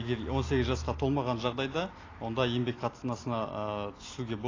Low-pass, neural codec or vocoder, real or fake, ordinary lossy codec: 7.2 kHz; none; real; AAC, 32 kbps